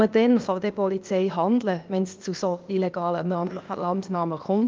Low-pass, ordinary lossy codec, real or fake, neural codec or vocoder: 7.2 kHz; Opus, 24 kbps; fake; codec, 16 kHz, 0.8 kbps, ZipCodec